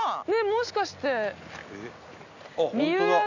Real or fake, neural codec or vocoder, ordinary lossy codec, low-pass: real; none; none; 7.2 kHz